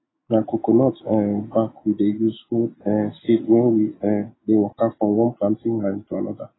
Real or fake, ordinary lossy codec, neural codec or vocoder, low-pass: real; AAC, 16 kbps; none; 7.2 kHz